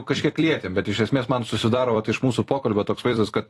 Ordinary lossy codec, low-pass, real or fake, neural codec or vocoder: AAC, 48 kbps; 14.4 kHz; fake; vocoder, 44.1 kHz, 128 mel bands every 256 samples, BigVGAN v2